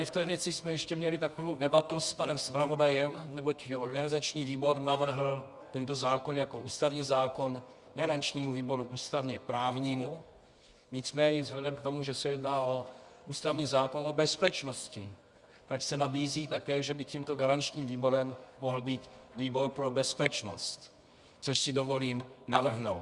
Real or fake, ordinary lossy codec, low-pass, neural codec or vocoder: fake; Opus, 64 kbps; 10.8 kHz; codec, 24 kHz, 0.9 kbps, WavTokenizer, medium music audio release